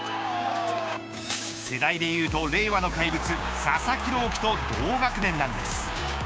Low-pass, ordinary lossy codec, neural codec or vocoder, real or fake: none; none; codec, 16 kHz, 6 kbps, DAC; fake